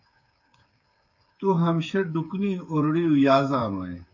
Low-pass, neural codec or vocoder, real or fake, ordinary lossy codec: 7.2 kHz; codec, 16 kHz, 8 kbps, FreqCodec, smaller model; fake; AAC, 48 kbps